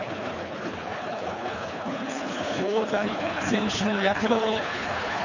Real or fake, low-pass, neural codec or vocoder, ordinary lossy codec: fake; 7.2 kHz; codec, 24 kHz, 3 kbps, HILCodec; none